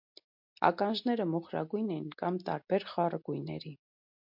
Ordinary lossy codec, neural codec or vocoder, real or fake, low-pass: MP3, 48 kbps; none; real; 5.4 kHz